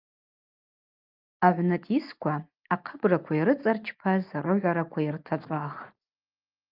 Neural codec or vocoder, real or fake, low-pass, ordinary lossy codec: none; real; 5.4 kHz; Opus, 24 kbps